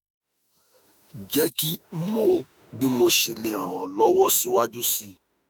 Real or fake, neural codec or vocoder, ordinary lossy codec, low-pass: fake; autoencoder, 48 kHz, 32 numbers a frame, DAC-VAE, trained on Japanese speech; none; none